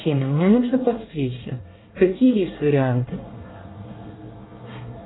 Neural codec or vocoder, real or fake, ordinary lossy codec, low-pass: codec, 24 kHz, 1 kbps, SNAC; fake; AAC, 16 kbps; 7.2 kHz